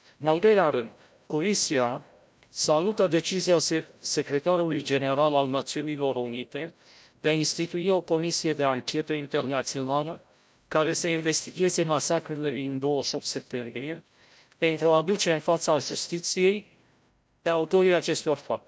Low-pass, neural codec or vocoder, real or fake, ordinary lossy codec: none; codec, 16 kHz, 0.5 kbps, FreqCodec, larger model; fake; none